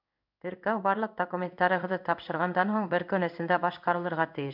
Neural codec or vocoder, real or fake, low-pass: codec, 16 kHz in and 24 kHz out, 1 kbps, XY-Tokenizer; fake; 5.4 kHz